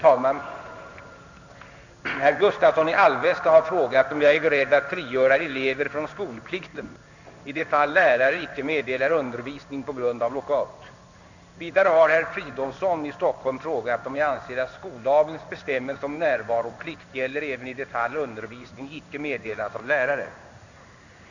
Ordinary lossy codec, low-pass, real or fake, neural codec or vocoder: none; 7.2 kHz; fake; codec, 16 kHz in and 24 kHz out, 1 kbps, XY-Tokenizer